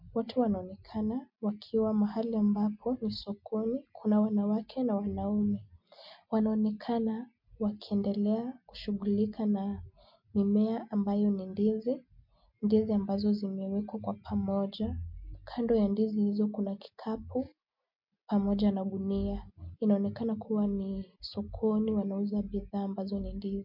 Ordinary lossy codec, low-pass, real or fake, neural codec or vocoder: MP3, 48 kbps; 5.4 kHz; real; none